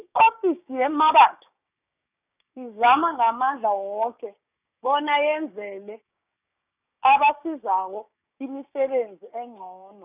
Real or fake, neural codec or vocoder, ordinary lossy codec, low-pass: fake; codec, 16 kHz, 6 kbps, DAC; none; 3.6 kHz